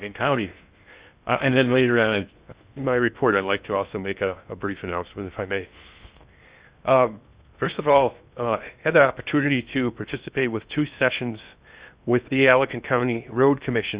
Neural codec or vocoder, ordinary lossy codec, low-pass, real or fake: codec, 16 kHz in and 24 kHz out, 0.6 kbps, FocalCodec, streaming, 2048 codes; Opus, 64 kbps; 3.6 kHz; fake